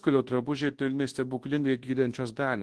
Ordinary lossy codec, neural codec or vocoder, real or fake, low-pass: Opus, 16 kbps; codec, 24 kHz, 0.9 kbps, WavTokenizer, large speech release; fake; 10.8 kHz